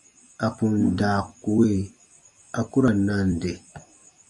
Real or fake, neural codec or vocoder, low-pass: fake; vocoder, 44.1 kHz, 128 mel bands every 256 samples, BigVGAN v2; 10.8 kHz